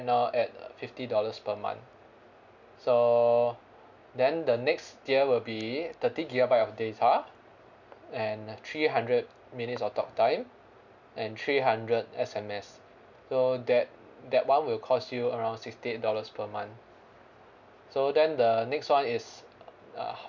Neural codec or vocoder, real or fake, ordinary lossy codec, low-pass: none; real; none; 7.2 kHz